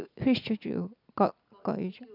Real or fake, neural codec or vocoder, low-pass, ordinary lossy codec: real; none; 5.4 kHz; none